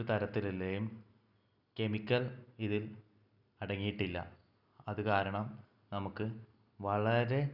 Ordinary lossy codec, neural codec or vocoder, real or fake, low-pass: none; none; real; 5.4 kHz